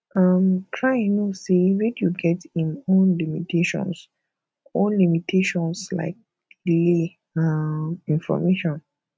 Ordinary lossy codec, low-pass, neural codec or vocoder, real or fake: none; none; none; real